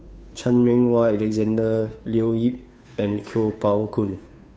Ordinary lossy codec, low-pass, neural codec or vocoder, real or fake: none; none; codec, 16 kHz, 2 kbps, FunCodec, trained on Chinese and English, 25 frames a second; fake